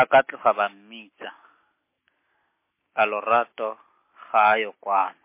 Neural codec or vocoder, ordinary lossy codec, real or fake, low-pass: none; MP3, 24 kbps; real; 3.6 kHz